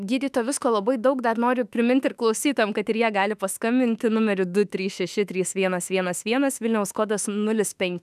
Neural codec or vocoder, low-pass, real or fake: autoencoder, 48 kHz, 32 numbers a frame, DAC-VAE, trained on Japanese speech; 14.4 kHz; fake